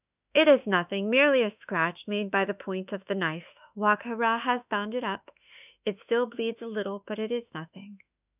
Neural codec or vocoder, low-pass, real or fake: autoencoder, 48 kHz, 32 numbers a frame, DAC-VAE, trained on Japanese speech; 3.6 kHz; fake